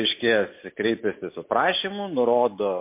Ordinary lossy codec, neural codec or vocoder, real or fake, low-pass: MP3, 24 kbps; none; real; 3.6 kHz